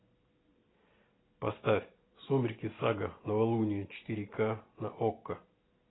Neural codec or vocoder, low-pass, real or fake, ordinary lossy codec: none; 7.2 kHz; real; AAC, 16 kbps